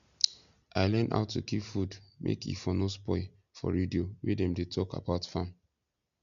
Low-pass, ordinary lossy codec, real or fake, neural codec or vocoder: 7.2 kHz; none; real; none